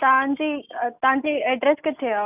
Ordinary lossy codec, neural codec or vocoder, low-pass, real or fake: none; none; 3.6 kHz; real